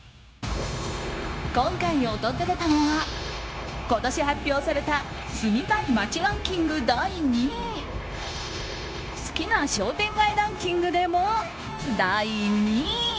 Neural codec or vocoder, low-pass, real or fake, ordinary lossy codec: codec, 16 kHz, 0.9 kbps, LongCat-Audio-Codec; none; fake; none